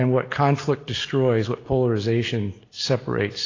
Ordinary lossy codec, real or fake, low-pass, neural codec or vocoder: AAC, 32 kbps; real; 7.2 kHz; none